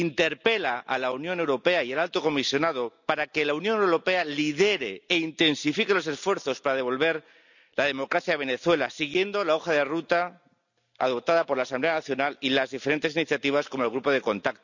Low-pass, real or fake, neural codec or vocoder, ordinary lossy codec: 7.2 kHz; real; none; none